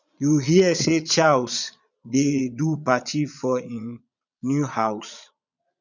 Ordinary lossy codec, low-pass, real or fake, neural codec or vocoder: none; 7.2 kHz; fake; vocoder, 22.05 kHz, 80 mel bands, Vocos